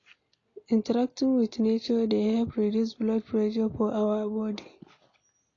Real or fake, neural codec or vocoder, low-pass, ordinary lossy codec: real; none; 7.2 kHz; AAC, 32 kbps